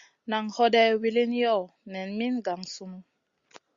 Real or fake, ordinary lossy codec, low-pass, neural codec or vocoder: real; Opus, 64 kbps; 7.2 kHz; none